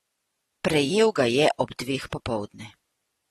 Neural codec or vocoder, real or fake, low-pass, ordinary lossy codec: none; real; 19.8 kHz; AAC, 32 kbps